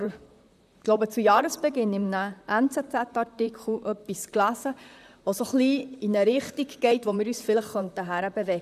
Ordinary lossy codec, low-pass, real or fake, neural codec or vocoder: none; 14.4 kHz; fake; vocoder, 44.1 kHz, 128 mel bands, Pupu-Vocoder